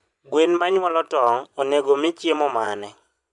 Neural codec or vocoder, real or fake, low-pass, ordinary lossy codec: vocoder, 24 kHz, 100 mel bands, Vocos; fake; 10.8 kHz; none